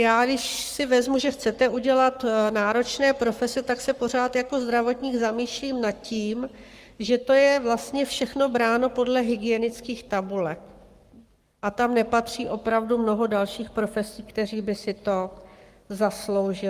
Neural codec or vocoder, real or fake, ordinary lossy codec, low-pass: codec, 44.1 kHz, 7.8 kbps, Pupu-Codec; fake; Opus, 32 kbps; 14.4 kHz